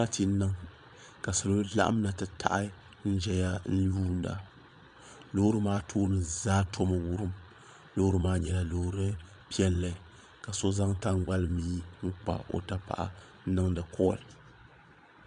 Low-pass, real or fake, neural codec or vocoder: 9.9 kHz; fake; vocoder, 22.05 kHz, 80 mel bands, Vocos